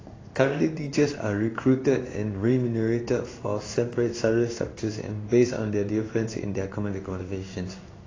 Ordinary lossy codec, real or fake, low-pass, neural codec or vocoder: AAC, 32 kbps; fake; 7.2 kHz; codec, 16 kHz in and 24 kHz out, 1 kbps, XY-Tokenizer